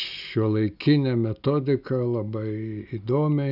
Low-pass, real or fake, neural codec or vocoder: 5.4 kHz; real; none